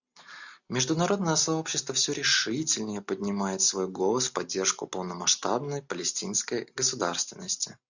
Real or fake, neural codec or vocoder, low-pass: real; none; 7.2 kHz